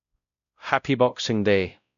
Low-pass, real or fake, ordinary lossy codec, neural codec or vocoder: 7.2 kHz; fake; none; codec, 16 kHz, 0.5 kbps, X-Codec, WavLM features, trained on Multilingual LibriSpeech